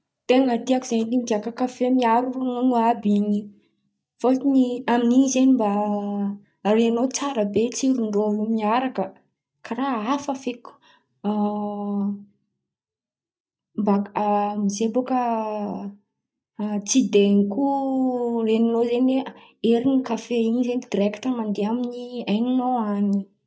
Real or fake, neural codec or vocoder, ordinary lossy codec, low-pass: real; none; none; none